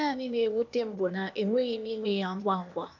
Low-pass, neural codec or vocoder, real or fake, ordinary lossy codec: 7.2 kHz; codec, 16 kHz, 0.8 kbps, ZipCodec; fake; none